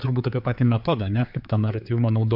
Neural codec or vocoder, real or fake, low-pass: codec, 16 kHz, 4 kbps, X-Codec, HuBERT features, trained on general audio; fake; 5.4 kHz